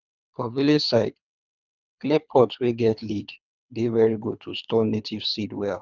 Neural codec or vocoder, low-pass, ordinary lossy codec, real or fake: codec, 24 kHz, 3 kbps, HILCodec; 7.2 kHz; none; fake